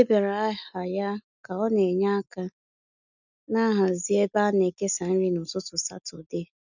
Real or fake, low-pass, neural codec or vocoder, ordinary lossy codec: real; 7.2 kHz; none; none